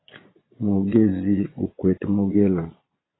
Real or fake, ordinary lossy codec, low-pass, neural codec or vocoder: fake; AAC, 16 kbps; 7.2 kHz; vocoder, 22.05 kHz, 80 mel bands, Vocos